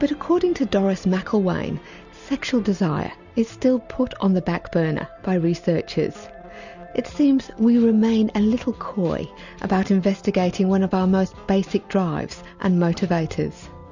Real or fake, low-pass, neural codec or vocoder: real; 7.2 kHz; none